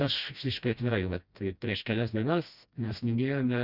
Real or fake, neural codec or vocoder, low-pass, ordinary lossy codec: fake; codec, 16 kHz, 1 kbps, FreqCodec, smaller model; 5.4 kHz; Opus, 64 kbps